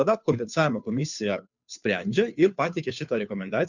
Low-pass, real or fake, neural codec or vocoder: 7.2 kHz; fake; codec, 16 kHz, 2 kbps, FunCodec, trained on Chinese and English, 25 frames a second